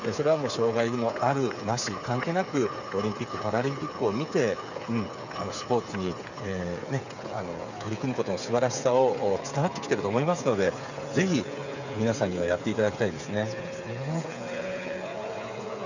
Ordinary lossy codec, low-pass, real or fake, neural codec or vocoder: none; 7.2 kHz; fake; codec, 16 kHz, 8 kbps, FreqCodec, smaller model